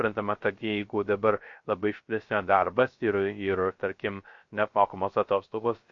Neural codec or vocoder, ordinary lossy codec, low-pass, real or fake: codec, 16 kHz, 0.3 kbps, FocalCodec; MP3, 48 kbps; 7.2 kHz; fake